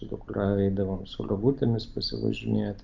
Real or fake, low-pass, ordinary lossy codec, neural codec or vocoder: real; 7.2 kHz; Opus, 32 kbps; none